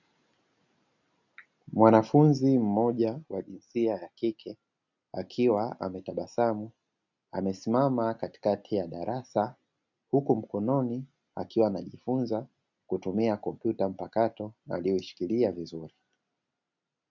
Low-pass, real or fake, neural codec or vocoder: 7.2 kHz; real; none